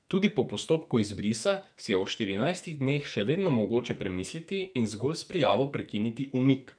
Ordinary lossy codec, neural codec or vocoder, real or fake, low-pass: none; codec, 44.1 kHz, 2.6 kbps, SNAC; fake; 9.9 kHz